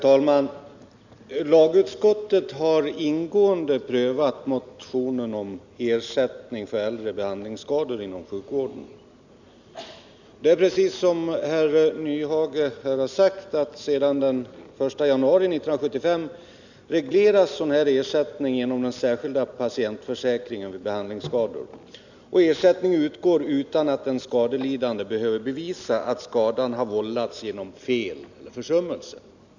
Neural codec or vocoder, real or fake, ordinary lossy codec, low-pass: none; real; none; 7.2 kHz